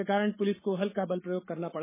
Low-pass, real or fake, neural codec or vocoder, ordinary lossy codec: 3.6 kHz; fake; codec, 44.1 kHz, 7.8 kbps, Pupu-Codec; MP3, 16 kbps